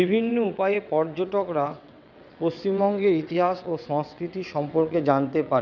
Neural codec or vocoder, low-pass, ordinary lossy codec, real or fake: vocoder, 22.05 kHz, 80 mel bands, WaveNeXt; 7.2 kHz; none; fake